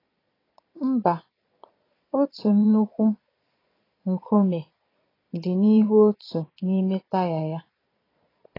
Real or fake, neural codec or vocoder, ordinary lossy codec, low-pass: fake; vocoder, 22.05 kHz, 80 mel bands, Vocos; AAC, 24 kbps; 5.4 kHz